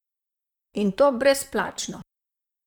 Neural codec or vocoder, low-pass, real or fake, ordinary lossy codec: vocoder, 44.1 kHz, 128 mel bands, Pupu-Vocoder; 19.8 kHz; fake; none